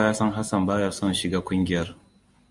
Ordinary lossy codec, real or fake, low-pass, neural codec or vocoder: Opus, 64 kbps; real; 10.8 kHz; none